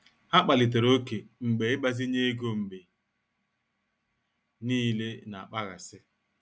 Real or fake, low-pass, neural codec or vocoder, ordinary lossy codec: real; none; none; none